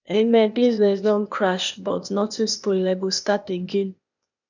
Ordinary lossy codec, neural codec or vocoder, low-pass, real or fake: none; codec, 16 kHz, 0.8 kbps, ZipCodec; 7.2 kHz; fake